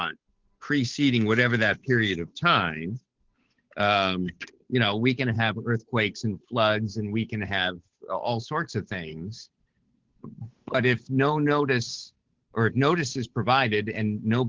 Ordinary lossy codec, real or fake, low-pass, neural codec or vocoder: Opus, 16 kbps; fake; 7.2 kHz; codec, 16 kHz, 8 kbps, FunCodec, trained on Chinese and English, 25 frames a second